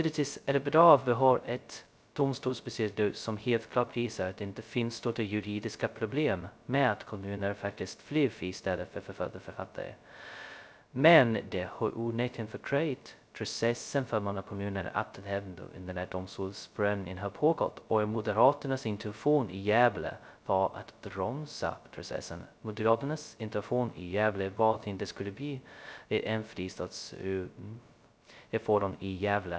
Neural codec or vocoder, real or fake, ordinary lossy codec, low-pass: codec, 16 kHz, 0.2 kbps, FocalCodec; fake; none; none